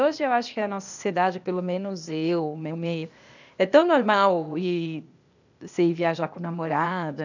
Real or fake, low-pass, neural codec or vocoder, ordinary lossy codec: fake; 7.2 kHz; codec, 16 kHz, 0.8 kbps, ZipCodec; none